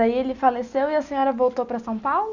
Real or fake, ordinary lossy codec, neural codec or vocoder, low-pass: real; Opus, 64 kbps; none; 7.2 kHz